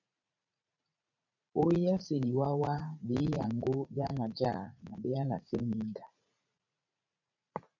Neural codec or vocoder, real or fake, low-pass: vocoder, 44.1 kHz, 80 mel bands, Vocos; fake; 7.2 kHz